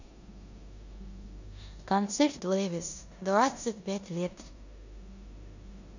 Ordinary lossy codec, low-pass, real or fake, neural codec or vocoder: none; 7.2 kHz; fake; codec, 16 kHz in and 24 kHz out, 0.9 kbps, LongCat-Audio-Codec, four codebook decoder